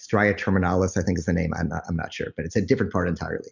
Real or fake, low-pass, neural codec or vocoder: real; 7.2 kHz; none